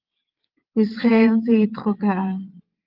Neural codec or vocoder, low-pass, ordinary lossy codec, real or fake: vocoder, 22.05 kHz, 80 mel bands, WaveNeXt; 5.4 kHz; Opus, 32 kbps; fake